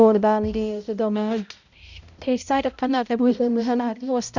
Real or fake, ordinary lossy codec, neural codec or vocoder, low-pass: fake; none; codec, 16 kHz, 0.5 kbps, X-Codec, HuBERT features, trained on balanced general audio; 7.2 kHz